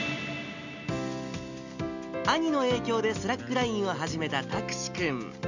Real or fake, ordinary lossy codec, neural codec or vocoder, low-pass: real; none; none; 7.2 kHz